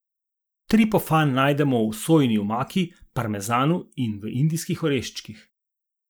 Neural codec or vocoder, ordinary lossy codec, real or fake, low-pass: none; none; real; none